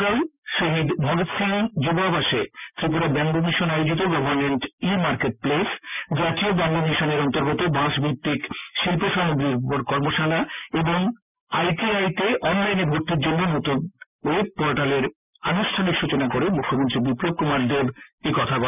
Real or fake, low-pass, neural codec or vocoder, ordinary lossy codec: real; 3.6 kHz; none; none